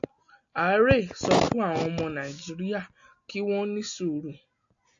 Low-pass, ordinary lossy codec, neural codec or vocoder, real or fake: 7.2 kHz; AAC, 64 kbps; none; real